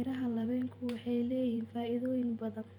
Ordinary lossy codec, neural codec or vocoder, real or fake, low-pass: none; vocoder, 44.1 kHz, 128 mel bands every 512 samples, BigVGAN v2; fake; 19.8 kHz